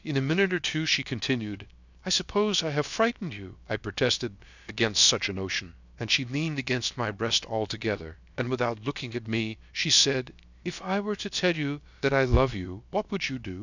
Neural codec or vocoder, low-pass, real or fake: codec, 16 kHz, about 1 kbps, DyCAST, with the encoder's durations; 7.2 kHz; fake